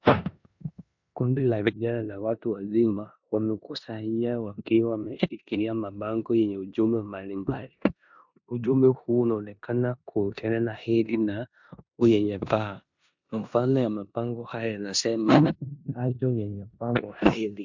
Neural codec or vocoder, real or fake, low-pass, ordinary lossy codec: codec, 16 kHz in and 24 kHz out, 0.9 kbps, LongCat-Audio-Codec, four codebook decoder; fake; 7.2 kHz; MP3, 64 kbps